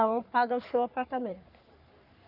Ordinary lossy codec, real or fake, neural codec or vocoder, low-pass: AAC, 48 kbps; fake; codec, 44.1 kHz, 3.4 kbps, Pupu-Codec; 5.4 kHz